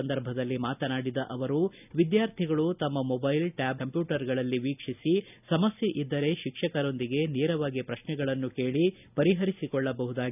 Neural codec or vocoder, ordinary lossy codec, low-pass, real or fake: none; none; 3.6 kHz; real